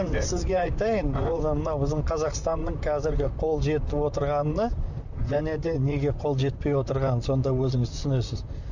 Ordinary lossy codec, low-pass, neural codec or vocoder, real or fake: none; 7.2 kHz; vocoder, 44.1 kHz, 128 mel bands, Pupu-Vocoder; fake